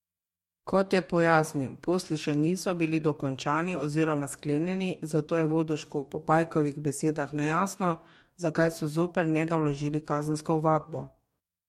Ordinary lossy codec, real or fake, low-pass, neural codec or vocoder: MP3, 64 kbps; fake; 19.8 kHz; codec, 44.1 kHz, 2.6 kbps, DAC